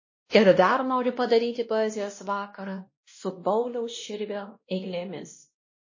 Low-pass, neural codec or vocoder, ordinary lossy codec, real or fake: 7.2 kHz; codec, 16 kHz, 1 kbps, X-Codec, WavLM features, trained on Multilingual LibriSpeech; MP3, 32 kbps; fake